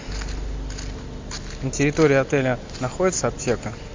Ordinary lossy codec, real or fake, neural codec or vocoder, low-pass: MP3, 64 kbps; real; none; 7.2 kHz